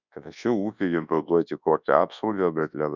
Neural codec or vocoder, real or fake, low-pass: codec, 24 kHz, 0.9 kbps, WavTokenizer, large speech release; fake; 7.2 kHz